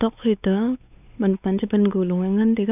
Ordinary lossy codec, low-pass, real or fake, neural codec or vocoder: none; 3.6 kHz; fake; codec, 16 kHz, 4 kbps, X-Codec, WavLM features, trained on Multilingual LibriSpeech